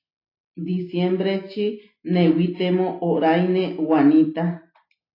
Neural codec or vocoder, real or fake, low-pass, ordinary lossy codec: none; real; 5.4 kHz; AAC, 32 kbps